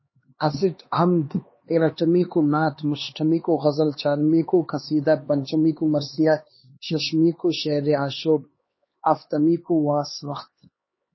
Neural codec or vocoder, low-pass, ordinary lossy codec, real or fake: codec, 16 kHz, 2 kbps, X-Codec, HuBERT features, trained on LibriSpeech; 7.2 kHz; MP3, 24 kbps; fake